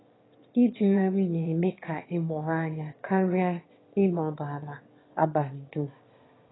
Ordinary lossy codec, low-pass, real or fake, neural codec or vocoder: AAC, 16 kbps; 7.2 kHz; fake; autoencoder, 22.05 kHz, a latent of 192 numbers a frame, VITS, trained on one speaker